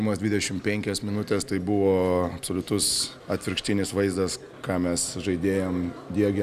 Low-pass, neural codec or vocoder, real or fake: 14.4 kHz; none; real